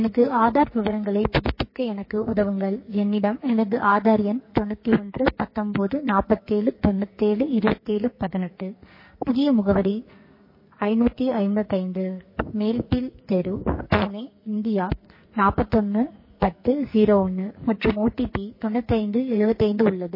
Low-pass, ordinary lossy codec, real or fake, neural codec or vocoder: 5.4 kHz; MP3, 24 kbps; fake; codec, 44.1 kHz, 2.6 kbps, SNAC